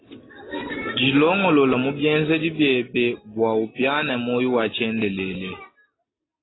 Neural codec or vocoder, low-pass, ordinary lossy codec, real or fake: none; 7.2 kHz; AAC, 16 kbps; real